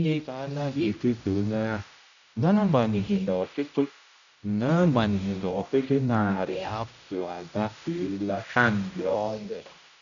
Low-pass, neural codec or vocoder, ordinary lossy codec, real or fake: 7.2 kHz; codec, 16 kHz, 0.5 kbps, X-Codec, HuBERT features, trained on general audio; none; fake